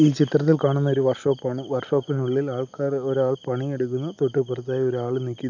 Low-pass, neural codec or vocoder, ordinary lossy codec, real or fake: 7.2 kHz; none; none; real